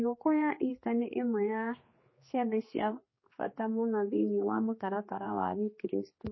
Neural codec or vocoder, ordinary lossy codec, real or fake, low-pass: codec, 16 kHz, 2 kbps, X-Codec, HuBERT features, trained on general audio; MP3, 24 kbps; fake; 7.2 kHz